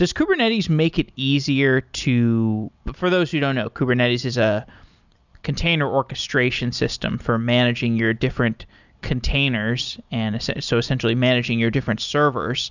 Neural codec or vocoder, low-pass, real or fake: none; 7.2 kHz; real